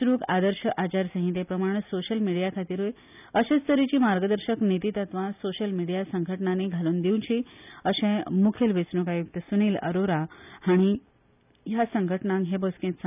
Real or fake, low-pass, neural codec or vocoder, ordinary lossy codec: real; 3.6 kHz; none; none